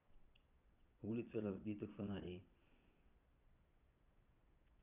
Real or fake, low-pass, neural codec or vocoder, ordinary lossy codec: fake; 3.6 kHz; vocoder, 22.05 kHz, 80 mel bands, Vocos; none